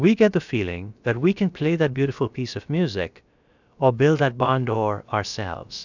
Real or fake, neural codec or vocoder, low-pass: fake; codec, 16 kHz, about 1 kbps, DyCAST, with the encoder's durations; 7.2 kHz